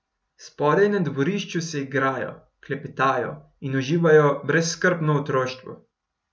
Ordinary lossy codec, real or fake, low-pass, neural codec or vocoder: none; real; none; none